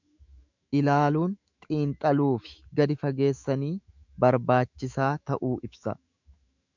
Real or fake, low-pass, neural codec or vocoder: fake; 7.2 kHz; autoencoder, 48 kHz, 128 numbers a frame, DAC-VAE, trained on Japanese speech